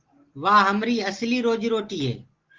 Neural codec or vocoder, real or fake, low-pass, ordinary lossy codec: none; real; 7.2 kHz; Opus, 16 kbps